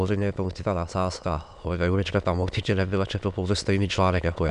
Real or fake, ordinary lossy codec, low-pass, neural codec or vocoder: fake; MP3, 96 kbps; 9.9 kHz; autoencoder, 22.05 kHz, a latent of 192 numbers a frame, VITS, trained on many speakers